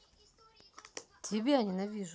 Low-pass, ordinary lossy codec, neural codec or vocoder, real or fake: none; none; none; real